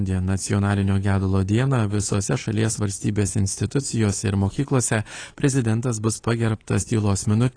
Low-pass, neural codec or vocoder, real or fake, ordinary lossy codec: 9.9 kHz; codec, 24 kHz, 3.1 kbps, DualCodec; fake; AAC, 32 kbps